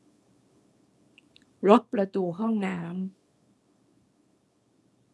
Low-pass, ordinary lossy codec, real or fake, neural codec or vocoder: none; none; fake; codec, 24 kHz, 0.9 kbps, WavTokenizer, small release